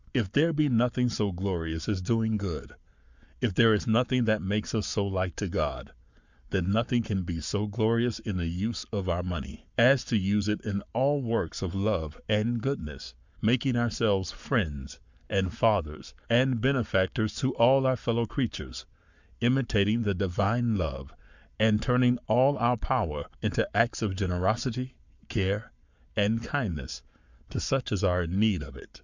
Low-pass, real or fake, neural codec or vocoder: 7.2 kHz; fake; codec, 44.1 kHz, 7.8 kbps, Pupu-Codec